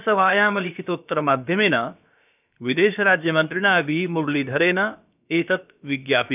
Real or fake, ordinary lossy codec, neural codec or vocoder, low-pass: fake; none; codec, 16 kHz, about 1 kbps, DyCAST, with the encoder's durations; 3.6 kHz